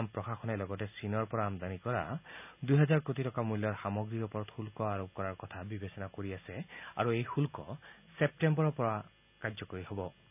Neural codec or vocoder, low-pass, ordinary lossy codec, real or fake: none; 3.6 kHz; none; real